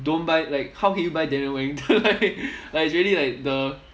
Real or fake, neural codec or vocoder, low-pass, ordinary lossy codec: real; none; none; none